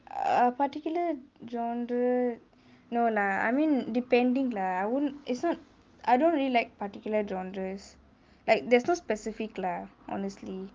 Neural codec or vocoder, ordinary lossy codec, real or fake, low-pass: none; Opus, 24 kbps; real; 7.2 kHz